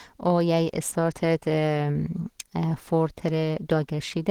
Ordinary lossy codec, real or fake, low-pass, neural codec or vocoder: Opus, 16 kbps; real; 19.8 kHz; none